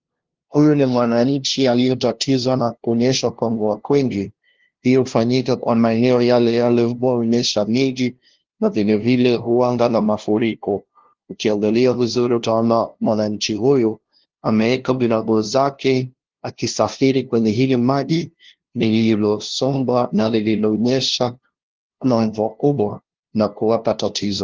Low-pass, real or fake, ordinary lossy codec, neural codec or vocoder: 7.2 kHz; fake; Opus, 16 kbps; codec, 16 kHz, 0.5 kbps, FunCodec, trained on LibriTTS, 25 frames a second